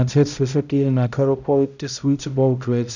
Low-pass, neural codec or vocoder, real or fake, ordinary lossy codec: 7.2 kHz; codec, 16 kHz, 0.5 kbps, X-Codec, HuBERT features, trained on balanced general audio; fake; none